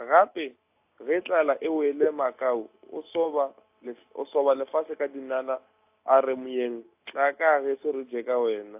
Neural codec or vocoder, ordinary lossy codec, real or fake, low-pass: none; AAC, 32 kbps; real; 3.6 kHz